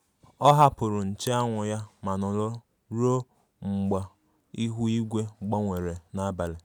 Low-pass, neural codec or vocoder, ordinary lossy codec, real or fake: none; none; none; real